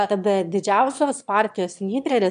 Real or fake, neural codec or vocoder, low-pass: fake; autoencoder, 22.05 kHz, a latent of 192 numbers a frame, VITS, trained on one speaker; 9.9 kHz